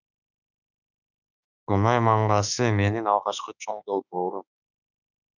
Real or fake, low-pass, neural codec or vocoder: fake; 7.2 kHz; autoencoder, 48 kHz, 32 numbers a frame, DAC-VAE, trained on Japanese speech